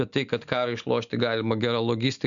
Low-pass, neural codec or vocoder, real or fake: 7.2 kHz; none; real